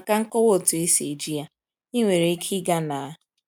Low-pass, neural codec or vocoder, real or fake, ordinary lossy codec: none; none; real; none